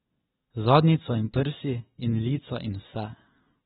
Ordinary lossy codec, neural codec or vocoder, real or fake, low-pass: AAC, 16 kbps; none; real; 7.2 kHz